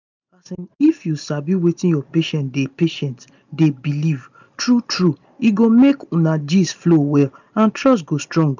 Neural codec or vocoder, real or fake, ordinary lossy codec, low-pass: none; real; none; 7.2 kHz